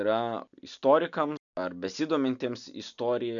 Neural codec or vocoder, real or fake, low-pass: none; real; 7.2 kHz